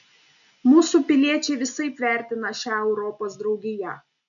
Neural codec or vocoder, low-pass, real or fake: none; 7.2 kHz; real